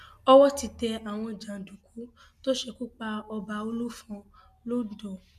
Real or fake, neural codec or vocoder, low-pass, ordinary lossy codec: real; none; 14.4 kHz; none